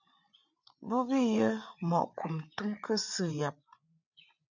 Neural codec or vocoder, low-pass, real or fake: codec, 16 kHz, 8 kbps, FreqCodec, larger model; 7.2 kHz; fake